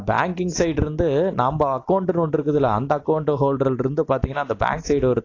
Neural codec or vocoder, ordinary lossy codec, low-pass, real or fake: none; AAC, 32 kbps; 7.2 kHz; real